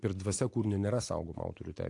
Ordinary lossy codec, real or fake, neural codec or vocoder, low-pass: AAC, 48 kbps; real; none; 10.8 kHz